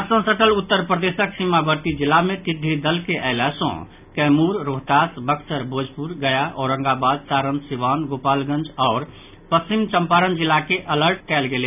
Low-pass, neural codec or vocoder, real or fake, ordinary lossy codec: 3.6 kHz; none; real; none